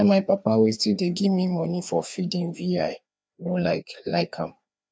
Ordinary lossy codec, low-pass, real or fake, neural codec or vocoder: none; none; fake; codec, 16 kHz, 2 kbps, FreqCodec, larger model